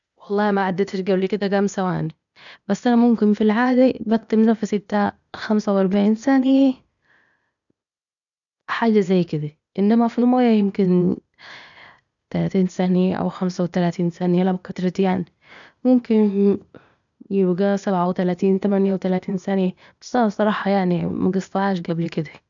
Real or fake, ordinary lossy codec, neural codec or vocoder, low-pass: fake; none; codec, 16 kHz, 0.8 kbps, ZipCodec; 7.2 kHz